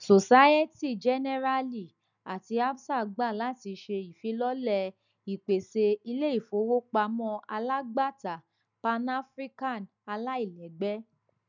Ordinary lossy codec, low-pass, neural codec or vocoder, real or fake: none; 7.2 kHz; none; real